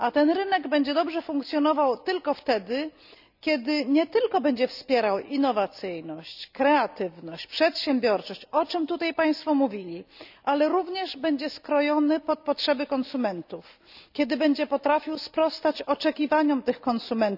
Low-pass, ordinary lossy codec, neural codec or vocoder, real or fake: 5.4 kHz; none; none; real